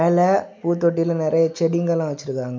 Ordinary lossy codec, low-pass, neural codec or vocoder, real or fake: none; none; none; real